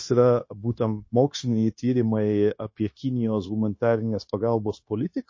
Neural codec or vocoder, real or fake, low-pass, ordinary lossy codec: codec, 16 kHz, 0.9 kbps, LongCat-Audio-Codec; fake; 7.2 kHz; MP3, 32 kbps